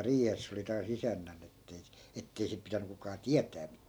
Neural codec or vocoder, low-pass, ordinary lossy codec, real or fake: none; none; none; real